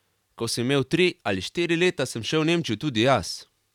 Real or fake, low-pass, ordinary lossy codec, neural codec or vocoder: fake; 19.8 kHz; none; vocoder, 44.1 kHz, 128 mel bands every 512 samples, BigVGAN v2